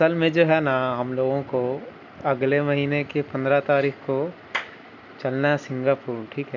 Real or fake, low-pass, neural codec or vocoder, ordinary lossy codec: real; 7.2 kHz; none; none